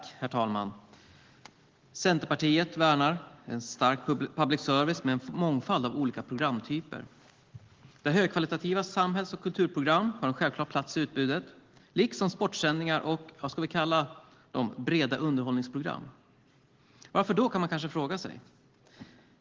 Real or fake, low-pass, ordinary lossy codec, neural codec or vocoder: real; 7.2 kHz; Opus, 16 kbps; none